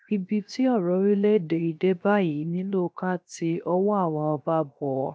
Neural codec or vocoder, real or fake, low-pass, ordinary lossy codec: codec, 16 kHz, 0.7 kbps, FocalCodec; fake; none; none